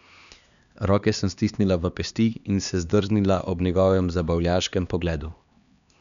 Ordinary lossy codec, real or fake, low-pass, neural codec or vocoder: none; fake; 7.2 kHz; codec, 16 kHz, 2 kbps, X-Codec, HuBERT features, trained on LibriSpeech